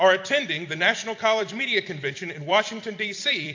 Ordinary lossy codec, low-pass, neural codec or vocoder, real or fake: AAC, 48 kbps; 7.2 kHz; vocoder, 44.1 kHz, 128 mel bands every 256 samples, BigVGAN v2; fake